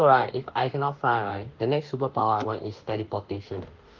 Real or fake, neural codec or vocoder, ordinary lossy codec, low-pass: fake; autoencoder, 48 kHz, 32 numbers a frame, DAC-VAE, trained on Japanese speech; Opus, 32 kbps; 7.2 kHz